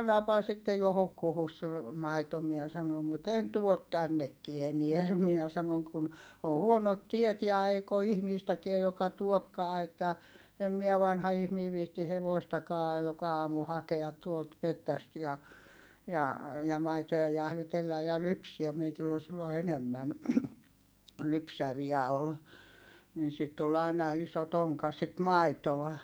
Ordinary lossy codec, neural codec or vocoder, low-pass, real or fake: none; codec, 44.1 kHz, 2.6 kbps, SNAC; none; fake